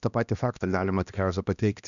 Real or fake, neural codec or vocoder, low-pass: fake; codec, 16 kHz, 1 kbps, X-Codec, HuBERT features, trained on balanced general audio; 7.2 kHz